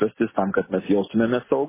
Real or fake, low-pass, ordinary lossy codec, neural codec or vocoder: real; 3.6 kHz; MP3, 16 kbps; none